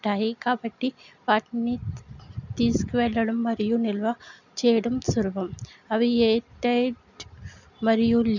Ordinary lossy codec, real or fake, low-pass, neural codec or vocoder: none; real; 7.2 kHz; none